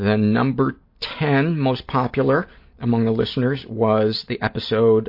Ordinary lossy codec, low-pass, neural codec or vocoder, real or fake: MP3, 32 kbps; 5.4 kHz; none; real